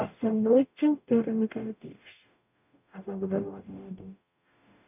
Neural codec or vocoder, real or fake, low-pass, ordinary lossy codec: codec, 44.1 kHz, 0.9 kbps, DAC; fake; 3.6 kHz; none